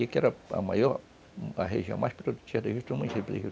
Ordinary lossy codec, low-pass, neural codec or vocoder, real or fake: none; none; none; real